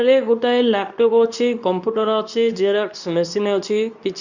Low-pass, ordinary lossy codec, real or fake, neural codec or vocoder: 7.2 kHz; none; fake; codec, 24 kHz, 0.9 kbps, WavTokenizer, medium speech release version 2